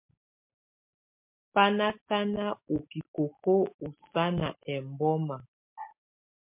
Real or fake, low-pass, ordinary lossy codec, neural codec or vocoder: real; 3.6 kHz; MP3, 32 kbps; none